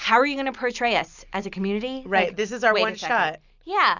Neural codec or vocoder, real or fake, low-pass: none; real; 7.2 kHz